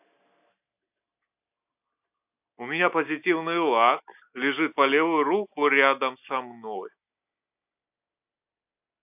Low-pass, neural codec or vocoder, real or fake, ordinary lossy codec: 3.6 kHz; none; real; AAC, 32 kbps